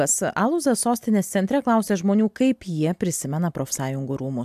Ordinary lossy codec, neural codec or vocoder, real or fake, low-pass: AAC, 96 kbps; none; real; 14.4 kHz